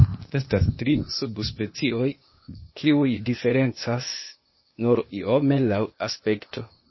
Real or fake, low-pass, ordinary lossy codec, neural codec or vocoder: fake; 7.2 kHz; MP3, 24 kbps; codec, 16 kHz, 0.8 kbps, ZipCodec